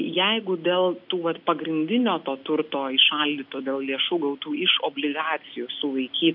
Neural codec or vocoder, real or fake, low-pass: none; real; 5.4 kHz